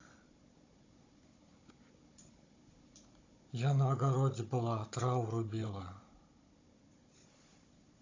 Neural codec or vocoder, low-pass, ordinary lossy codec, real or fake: codec, 16 kHz, 16 kbps, FunCodec, trained on Chinese and English, 50 frames a second; 7.2 kHz; AAC, 32 kbps; fake